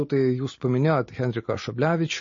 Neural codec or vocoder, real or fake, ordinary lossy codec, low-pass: none; real; MP3, 32 kbps; 7.2 kHz